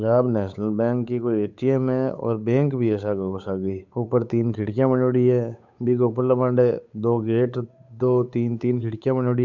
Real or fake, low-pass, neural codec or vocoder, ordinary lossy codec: fake; 7.2 kHz; codec, 16 kHz, 8 kbps, FunCodec, trained on Chinese and English, 25 frames a second; none